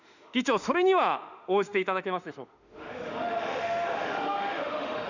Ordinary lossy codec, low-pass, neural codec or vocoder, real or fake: none; 7.2 kHz; autoencoder, 48 kHz, 32 numbers a frame, DAC-VAE, trained on Japanese speech; fake